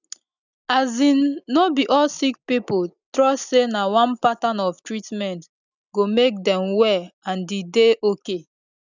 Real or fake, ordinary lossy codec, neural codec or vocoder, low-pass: real; none; none; 7.2 kHz